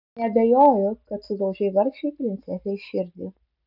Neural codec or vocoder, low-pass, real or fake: none; 5.4 kHz; real